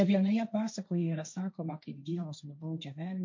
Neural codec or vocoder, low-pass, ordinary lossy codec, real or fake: codec, 16 kHz, 1.1 kbps, Voila-Tokenizer; 7.2 kHz; MP3, 48 kbps; fake